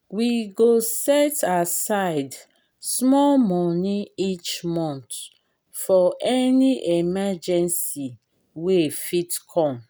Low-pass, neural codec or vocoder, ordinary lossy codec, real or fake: none; none; none; real